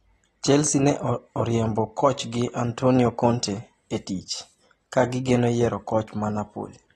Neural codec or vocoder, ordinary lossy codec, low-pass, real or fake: none; AAC, 32 kbps; 19.8 kHz; real